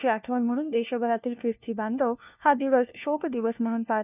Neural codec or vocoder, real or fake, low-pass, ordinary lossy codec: codec, 16 kHz, 1 kbps, FunCodec, trained on LibriTTS, 50 frames a second; fake; 3.6 kHz; none